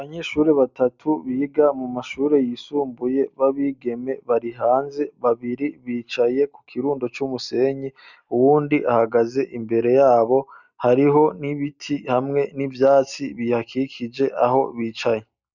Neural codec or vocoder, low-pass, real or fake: none; 7.2 kHz; real